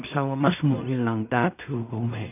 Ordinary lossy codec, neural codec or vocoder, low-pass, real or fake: none; codec, 16 kHz in and 24 kHz out, 0.4 kbps, LongCat-Audio-Codec, two codebook decoder; 3.6 kHz; fake